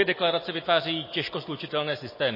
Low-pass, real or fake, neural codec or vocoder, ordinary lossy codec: 5.4 kHz; real; none; MP3, 24 kbps